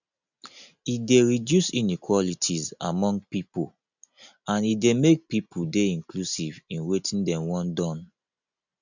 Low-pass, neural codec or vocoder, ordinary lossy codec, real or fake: 7.2 kHz; none; none; real